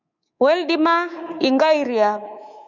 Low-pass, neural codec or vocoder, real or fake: 7.2 kHz; codec, 24 kHz, 3.1 kbps, DualCodec; fake